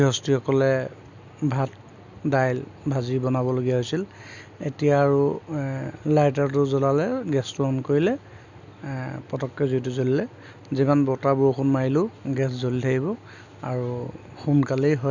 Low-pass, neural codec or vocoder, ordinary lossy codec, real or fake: 7.2 kHz; none; none; real